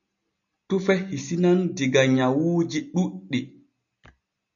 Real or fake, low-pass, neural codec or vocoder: real; 7.2 kHz; none